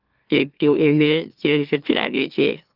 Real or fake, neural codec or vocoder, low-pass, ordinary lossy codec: fake; autoencoder, 44.1 kHz, a latent of 192 numbers a frame, MeloTTS; 5.4 kHz; Opus, 24 kbps